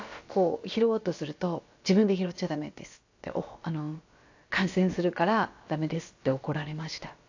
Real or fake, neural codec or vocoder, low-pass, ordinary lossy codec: fake; codec, 16 kHz, about 1 kbps, DyCAST, with the encoder's durations; 7.2 kHz; AAC, 48 kbps